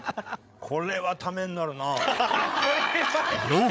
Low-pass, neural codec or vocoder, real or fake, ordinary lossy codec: none; codec, 16 kHz, 8 kbps, FreqCodec, larger model; fake; none